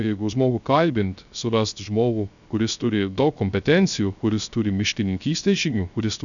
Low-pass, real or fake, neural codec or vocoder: 7.2 kHz; fake; codec, 16 kHz, 0.3 kbps, FocalCodec